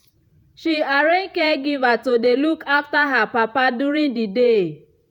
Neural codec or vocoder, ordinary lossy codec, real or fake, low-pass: vocoder, 48 kHz, 128 mel bands, Vocos; none; fake; 19.8 kHz